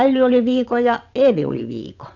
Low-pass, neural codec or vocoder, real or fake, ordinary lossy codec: 7.2 kHz; vocoder, 22.05 kHz, 80 mel bands, WaveNeXt; fake; none